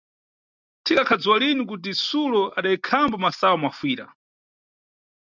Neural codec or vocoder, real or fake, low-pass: none; real; 7.2 kHz